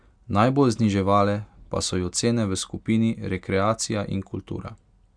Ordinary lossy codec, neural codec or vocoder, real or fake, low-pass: Opus, 64 kbps; none; real; 9.9 kHz